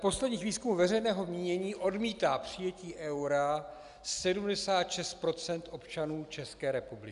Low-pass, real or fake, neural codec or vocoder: 10.8 kHz; real; none